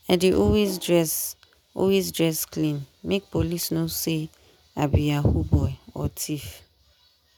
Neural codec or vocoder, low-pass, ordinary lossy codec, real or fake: none; none; none; real